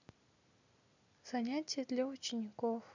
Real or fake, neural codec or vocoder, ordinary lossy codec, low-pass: real; none; none; 7.2 kHz